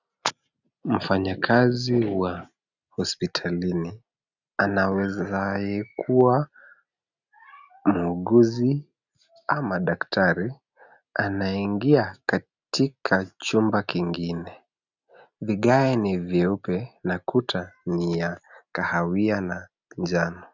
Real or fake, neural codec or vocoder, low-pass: real; none; 7.2 kHz